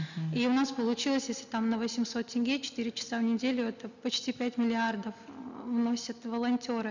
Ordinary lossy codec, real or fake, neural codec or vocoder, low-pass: none; real; none; 7.2 kHz